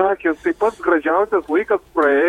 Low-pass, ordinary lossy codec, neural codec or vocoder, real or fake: 19.8 kHz; MP3, 64 kbps; vocoder, 48 kHz, 128 mel bands, Vocos; fake